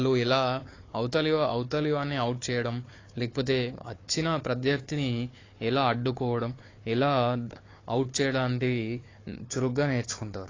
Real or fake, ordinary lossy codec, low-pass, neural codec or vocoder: fake; AAC, 32 kbps; 7.2 kHz; codec, 16 kHz, 4 kbps, FunCodec, trained on Chinese and English, 50 frames a second